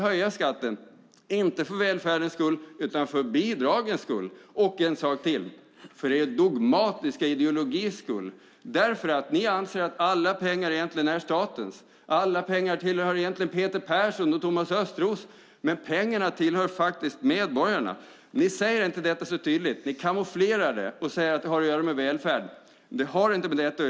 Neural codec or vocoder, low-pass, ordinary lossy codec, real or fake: none; none; none; real